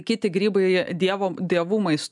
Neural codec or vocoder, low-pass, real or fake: none; 10.8 kHz; real